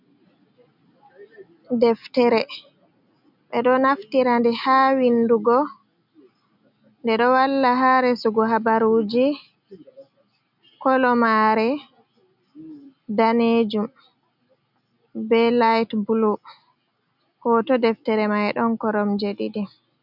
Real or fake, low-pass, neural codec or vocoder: real; 5.4 kHz; none